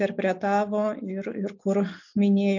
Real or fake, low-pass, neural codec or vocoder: real; 7.2 kHz; none